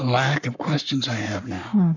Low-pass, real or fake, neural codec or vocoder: 7.2 kHz; fake; codec, 44.1 kHz, 7.8 kbps, Pupu-Codec